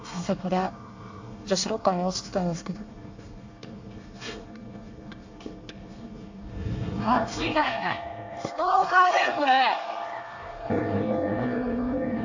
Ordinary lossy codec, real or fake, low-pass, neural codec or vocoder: AAC, 48 kbps; fake; 7.2 kHz; codec, 24 kHz, 1 kbps, SNAC